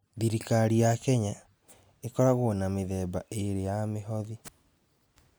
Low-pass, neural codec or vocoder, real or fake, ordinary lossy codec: none; none; real; none